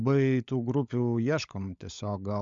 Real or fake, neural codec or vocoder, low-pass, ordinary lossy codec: fake; codec, 16 kHz, 16 kbps, FunCodec, trained on LibriTTS, 50 frames a second; 7.2 kHz; MP3, 64 kbps